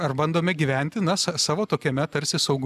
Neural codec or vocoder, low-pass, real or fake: none; 14.4 kHz; real